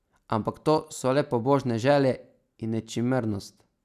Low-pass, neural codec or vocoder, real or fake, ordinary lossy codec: 14.4 kHz; none; real; none